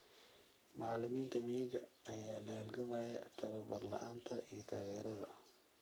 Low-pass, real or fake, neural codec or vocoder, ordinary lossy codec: none; fake; codec, 44.1 kHz, 3.4 kbps, Pupu-Codec; none